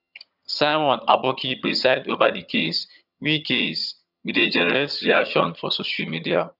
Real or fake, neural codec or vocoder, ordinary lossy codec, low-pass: fake; vocoder, 22.05 kHz, 80 mel bands, HiFi-GAN; none; 5.4 kHz